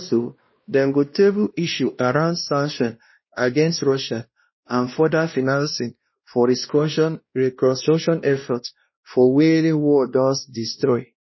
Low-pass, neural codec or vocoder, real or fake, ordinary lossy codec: 7.2 kHz; codec, 16 kHz, 1 kbps, X-Codec, WavLM features, trained on Multilingual LibriSpeech; fake; MP3, 24 kbps